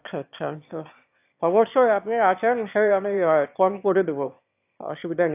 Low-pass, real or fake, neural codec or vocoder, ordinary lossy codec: 3.6 kHz; fake; autoencoder, 22.05 kHz, a latent of 192 numbers a frame, VITS, trained on one speaker; AAC, 32 kbps